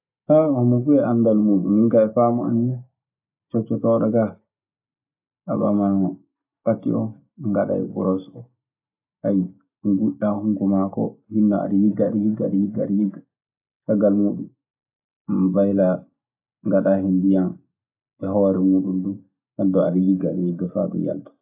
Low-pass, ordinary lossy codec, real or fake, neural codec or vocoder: 3.6 kHz; AAC, 32 kbps; real; none